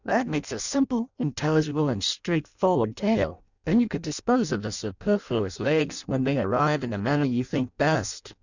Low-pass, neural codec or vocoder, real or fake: 7.2 kHz; codec, 16 kHz in and 24 kHz out, 0.6 kbps, FireRedTTS-2 codec; fake